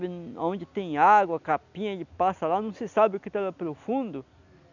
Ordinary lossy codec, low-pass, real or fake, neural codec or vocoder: none; 7.2 kHz; real; none